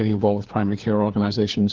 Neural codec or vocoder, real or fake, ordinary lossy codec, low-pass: codec, 16 kHz, 4 kbps, FunCodec, trained on LibriTTS, 50 frames a second; fake; Opus, 16 kbps; 7.2 kHz